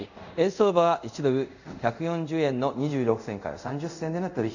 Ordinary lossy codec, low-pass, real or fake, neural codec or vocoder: none; 7.2 kHz; fake; codec, 24 kHz, 0.5 kbps, DualCodec